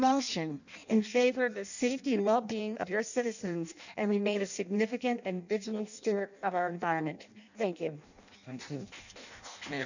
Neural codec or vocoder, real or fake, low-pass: codec, 16 kHz in and 24 kHz out, 0.6 kbps, FireRedTTS-2 codec; fake; 7.2 kHz